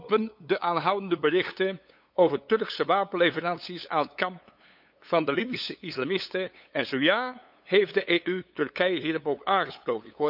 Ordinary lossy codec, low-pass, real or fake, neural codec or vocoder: none; 5.4 kHz; fake; codec, 16 kHz, 8 kbps, FunCodec, trained on LibriTTS, 25 frames a second